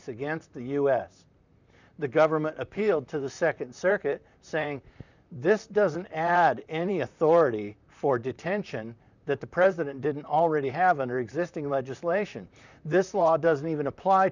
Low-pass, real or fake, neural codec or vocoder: 7.2 kHz; fake; vocoder, 44.1 kHz, 128 mel bands, Pupu-Vocoder